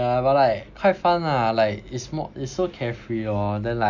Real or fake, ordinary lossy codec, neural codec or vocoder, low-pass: real; none; none; 7.2 kHz